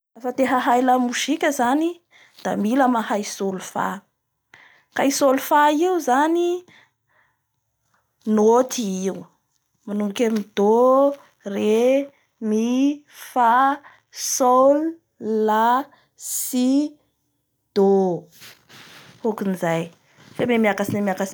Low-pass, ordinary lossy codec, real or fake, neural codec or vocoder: none; none; real; none